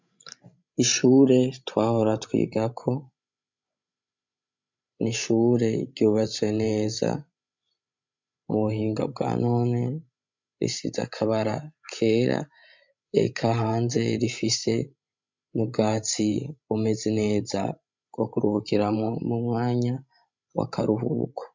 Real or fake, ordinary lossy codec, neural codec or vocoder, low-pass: fake; MP3, 64 kbps; codec, 16 kHz, 16 kbps, FreqCodec, larger model; 7.2 kHz